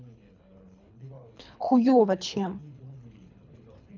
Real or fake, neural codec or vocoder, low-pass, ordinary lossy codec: fake; codec, 24 kHz, 3 kbps, HILCodec; 7.2 kHz; none